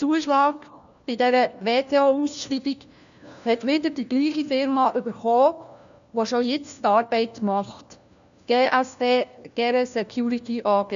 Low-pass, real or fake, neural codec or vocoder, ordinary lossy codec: 7.2 kHz; fake; codec, 16 kHz, 1 kbps, FunCodec, trained on LibriTTS, 50 frames a second; none